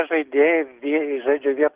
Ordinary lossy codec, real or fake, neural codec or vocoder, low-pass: Opus, 16 kbps; real; none; 3.6 kHz